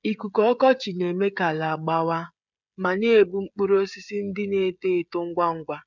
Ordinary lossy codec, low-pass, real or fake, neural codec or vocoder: none; 7.2 kHz; fake; codec, 16 kHz, 16 kbps, FreqCodec, smaller model